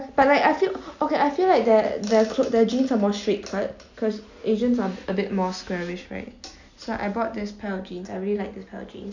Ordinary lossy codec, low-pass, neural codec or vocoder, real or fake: none; 7.2 kHz; none; real